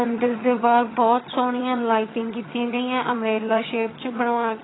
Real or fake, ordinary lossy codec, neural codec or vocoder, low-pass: fake; AAC, 16 kbps; vocoder, 22.05 kHz, 80 mel bands, HiFi-GAN; 7.2 kHz